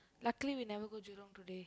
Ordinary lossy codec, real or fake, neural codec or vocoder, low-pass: none; real; none; none